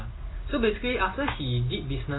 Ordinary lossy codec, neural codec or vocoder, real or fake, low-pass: AAC, 16 kbps; none; real; 7.2 kHz